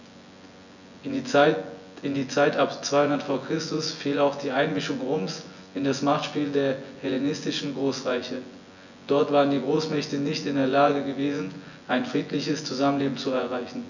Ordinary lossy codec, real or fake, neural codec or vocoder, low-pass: none; fake; vocoder, 24 kHz, 100 mel bands, Vocos; 7.2 kHz